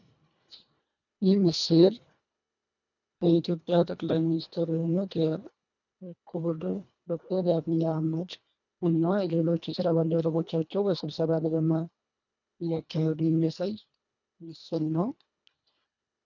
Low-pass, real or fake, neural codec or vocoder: 7.2 kHz; fake; codec, 24 kHz, 1.5 kbps, HILCodec